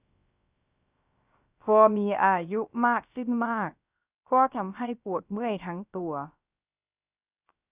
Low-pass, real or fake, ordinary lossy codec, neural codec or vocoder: 3.6 kHz; fake; Opus, 64 kbps; codec, 16 kHz, 0.3 kbps, FocalCodec